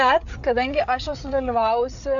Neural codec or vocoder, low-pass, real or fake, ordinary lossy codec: codec, 16 kHz, 8 kbps, FreqCodec, larger model; 7.2 kHz; fake; AAC, 64 kbps